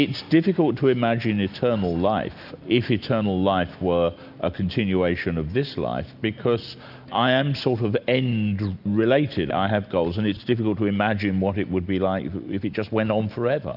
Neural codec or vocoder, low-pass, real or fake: none; 5.4 kHz; real